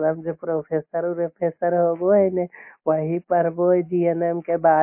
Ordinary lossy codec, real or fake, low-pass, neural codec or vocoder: MP3, 24 kbps; real; 3.6 kHz; none